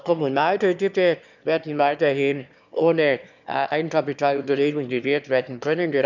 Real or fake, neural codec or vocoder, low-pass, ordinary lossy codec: fake; autoencoder, 22.05 kHz, a latent of 192 numbers a frame, VITS, trained on one speaker; 7.2 kHz; none